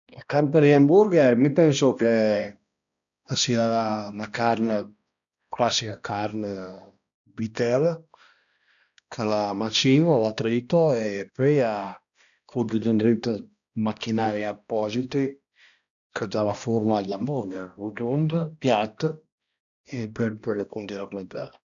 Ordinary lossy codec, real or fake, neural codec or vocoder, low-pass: none; fake; codec, 16 kHz, 1 kbps, X-Codec, HuBERT features, trained on balanced general audio; 7.2 kHz